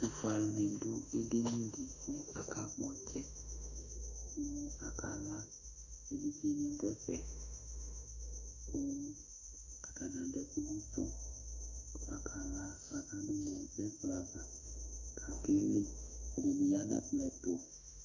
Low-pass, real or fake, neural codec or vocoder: 7.2 kHz; fake; autoencoder, 48 kHz, 32 numbers a frame, DAC-VAE, trained on Japanese speech